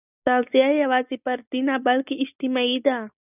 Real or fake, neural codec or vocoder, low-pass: real; none; 3.6 kHz